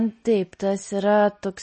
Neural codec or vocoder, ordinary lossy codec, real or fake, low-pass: none; MP3, 32 kbps; real; 10.8 kHz